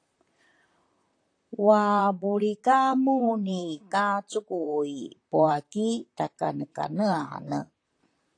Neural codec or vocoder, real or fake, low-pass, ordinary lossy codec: vocoder, 44.1 kHz, 128 mel bands, Pupu-Vocoder; fake; 9.9 kHz; AAC, 48 kbps